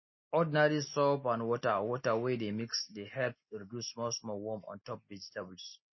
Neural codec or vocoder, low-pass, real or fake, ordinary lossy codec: none; 7.2 kHz; real; MP3, 24 kbps